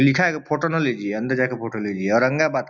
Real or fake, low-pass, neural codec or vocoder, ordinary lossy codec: real; none; none; none